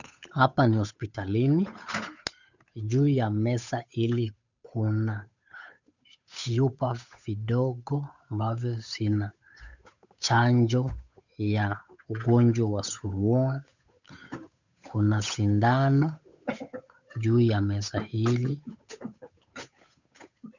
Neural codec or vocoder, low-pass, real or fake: codec, 16 kHz, 8 kbps, FunCodec, trained on Chinese and English, 25 frames a second; 7.2 kHz; fake